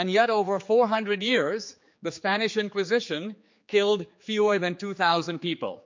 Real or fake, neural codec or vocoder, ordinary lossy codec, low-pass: fake; codec, 16 kHz, 4 kbps, X-Codec, HuBERT features, trained on general audio; MP3, 48 kbps; 7.2 kHz